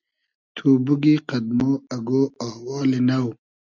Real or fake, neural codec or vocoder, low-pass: real; none; 7.2 kHz